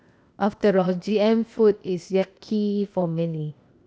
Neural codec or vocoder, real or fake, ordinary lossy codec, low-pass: codec, 16 kHz, 0.8 kbps, ZipCodec; fake; none; none